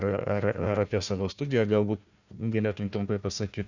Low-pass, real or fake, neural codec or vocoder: 7.2 kHz; fake; codec, 16 kHz, 1 kbps, FunCodec, trained on Chinese and English, 50 frames a second